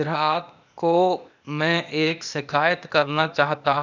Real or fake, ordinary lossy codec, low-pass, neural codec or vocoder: fake; none; 7.2 kHz; codec, 16 kHz, 0.8 kbps, ZipCodec